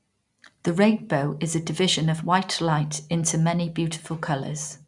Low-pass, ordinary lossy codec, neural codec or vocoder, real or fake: 10.8 kHz; none; none; real